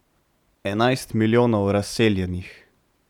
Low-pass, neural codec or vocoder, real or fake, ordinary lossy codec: 19.8 kHz; vocoder, 44.1 kHz, 128 mel bands every 512 samples, BigVGAN v2; fake; none